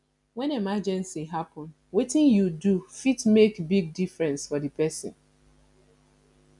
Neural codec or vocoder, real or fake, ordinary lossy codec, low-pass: none; real; MP3, 96 kbps; 10.8 kHz